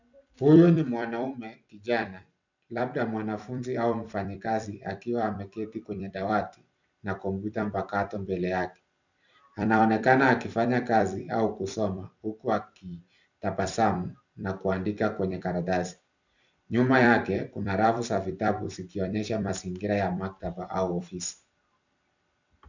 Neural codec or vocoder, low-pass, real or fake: vocoder, 44.1 kHz, 128 mel bands every 256 samples, BigVGAN v2; 7.2 kHz; fake